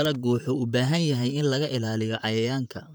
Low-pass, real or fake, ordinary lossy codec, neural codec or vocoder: none; fake; none; vocoder, 44.1 kHz, 128 mel bands, Pupu-Vocoder